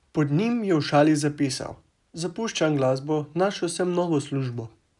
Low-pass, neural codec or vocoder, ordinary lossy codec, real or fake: 10.8 kHz; none; none; real